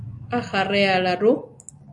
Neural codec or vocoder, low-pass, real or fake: none; 10.8 kHz; real